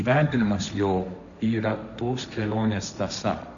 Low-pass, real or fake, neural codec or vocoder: 7.2 kHz; fake; codec, 16 kHz, 1.1 kbps, Voila-Tokenizer